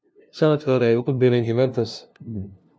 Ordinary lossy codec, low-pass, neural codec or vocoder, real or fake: none; none; codec, 16 kHz, 0.5 kbps, FunCodec, trained on LibriTTS, 25 frames a second; fake